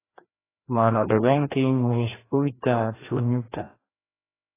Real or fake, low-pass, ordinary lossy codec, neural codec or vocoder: fake; 3.6 kHz; AAC, 16 kbps; codec, 16 kHz, 1 kbps, FreqCodec, larger model